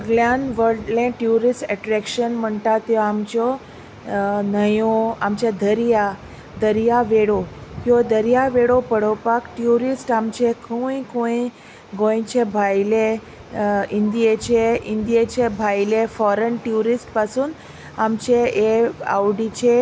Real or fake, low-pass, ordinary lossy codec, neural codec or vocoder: real; none; none; none